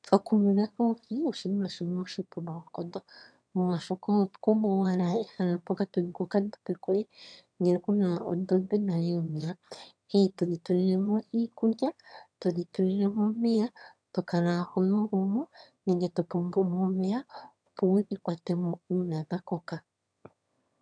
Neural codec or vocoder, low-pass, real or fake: autoencoder, 22.05 kHz, a latent of 192 numbers a frame, VITS, trained on one speaker; 9.9 kHz; fake